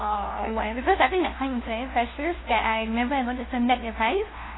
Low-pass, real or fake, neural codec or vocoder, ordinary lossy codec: 7.2 kHz; fake; codec, 16 kHz, 0.5 kbps, FunCodec, trained on LibriTTS, 25 frames a second; AAC, 16 kbps